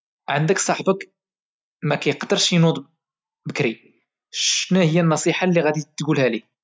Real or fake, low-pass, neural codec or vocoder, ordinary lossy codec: real; none; none; none